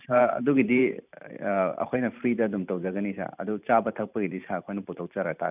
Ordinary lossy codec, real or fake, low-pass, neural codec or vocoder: none; fake; 3.6 kHz; vocoder, 44.1 kHz, 128 mel bands every 512 samples, BigVGAN v2